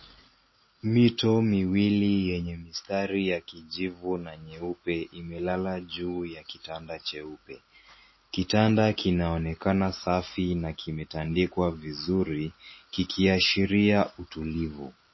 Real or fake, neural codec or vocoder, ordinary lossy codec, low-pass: real; none; MP3, 24 kbps; 7.2 kHz